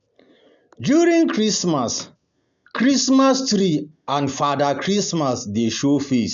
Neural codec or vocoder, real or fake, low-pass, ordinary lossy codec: none; real; 7.2 kHz; none